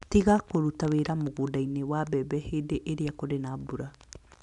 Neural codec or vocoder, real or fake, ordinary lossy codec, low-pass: none; real; none; 10.8 kHz